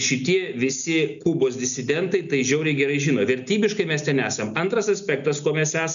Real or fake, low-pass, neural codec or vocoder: real; 7.2 kHz; none